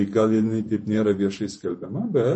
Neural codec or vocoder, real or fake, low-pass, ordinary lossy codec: vocoder, 48 kHz, 128 mel bands, Vocos; fake; 10.8 kHz; MP3, 32 kbps